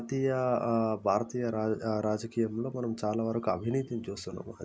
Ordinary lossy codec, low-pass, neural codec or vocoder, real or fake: none; none; none; real